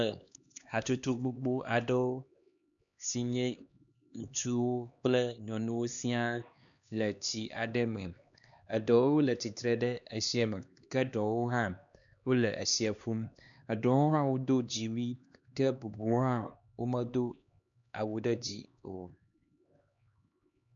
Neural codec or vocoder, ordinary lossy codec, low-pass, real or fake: codec, 16 kHz, 2 kbps, X-Codec, HuBERT features, trained on LibriSpeech; MP3, 96 kbps; 7.2 kHz; fake